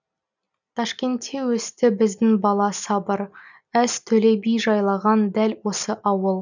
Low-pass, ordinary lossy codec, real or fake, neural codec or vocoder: 7.2 kHz; none; real; none